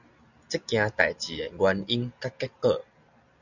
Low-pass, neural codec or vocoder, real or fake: 7.2 kHz; none; real